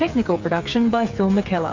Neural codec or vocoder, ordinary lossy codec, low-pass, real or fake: codec, 16 kHz, 8 kbps, FreqCodec, smaller model; MP3, 48 kbps; 7.2 kHz; fake